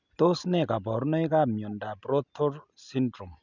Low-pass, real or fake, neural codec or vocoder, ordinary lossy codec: 7.2 kHz; real; none; none